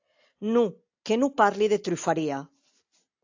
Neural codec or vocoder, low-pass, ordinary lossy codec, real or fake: none; 7.2 kHz; AAC, 48 kbps; real